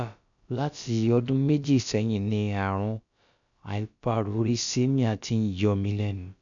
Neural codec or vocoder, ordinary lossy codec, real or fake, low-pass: codec, 16 kHz, about 1 kbps, DyCAST, with the encoder's durations; none; fake; 7.2 kHz